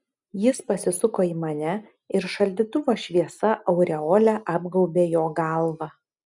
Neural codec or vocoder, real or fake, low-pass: none; real; 10.8 kHz